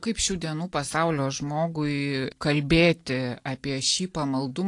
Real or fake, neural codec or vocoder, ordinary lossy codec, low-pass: real; none; AAC, 48 kbps; 10.8 kHz